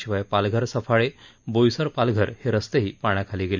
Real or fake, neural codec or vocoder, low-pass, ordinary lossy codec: real; none; 7.2 kHz; none